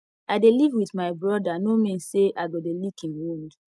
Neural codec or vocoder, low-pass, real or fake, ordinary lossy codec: none; none; real; none